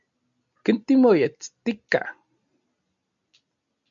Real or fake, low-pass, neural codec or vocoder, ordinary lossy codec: real; 7.2 kHz; none; MP3, 64 kbps